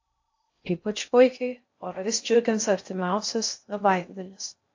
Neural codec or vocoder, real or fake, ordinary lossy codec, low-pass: codec, 16 kHz in and 24 kHz out, 0.6 kbps, FocalCodec, streaming, 2048 codes; fake; AAC, 48 kbps; 7.2 kHz